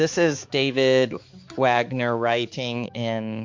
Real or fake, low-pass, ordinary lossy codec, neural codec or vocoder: fake; 7.2 kHz; MP3, 48 kbps; codec, 16 kHz, 4 kbps, X-Codec, HuBERT features, trained on balanced general audio